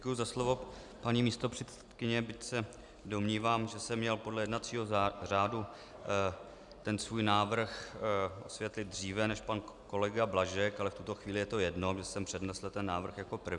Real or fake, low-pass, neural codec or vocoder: real; 10.8 kHz; none